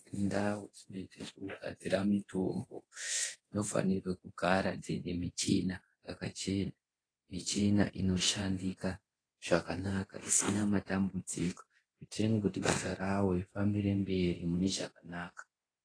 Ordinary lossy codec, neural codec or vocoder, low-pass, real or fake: AAC, 32 kbps; codec, 24 kHz, 0.9 kbps, DualCodec; 9.9 kHz; fake